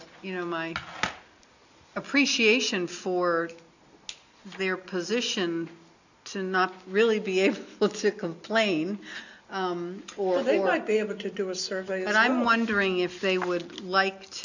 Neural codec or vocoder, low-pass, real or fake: none; 7.2 kHz; real